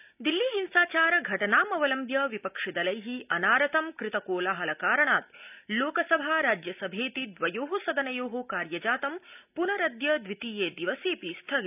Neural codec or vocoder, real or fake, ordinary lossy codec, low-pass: none; real; none; 3.6 kHz